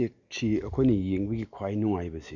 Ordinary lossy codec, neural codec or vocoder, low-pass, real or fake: none; none; 7.2 kHz; real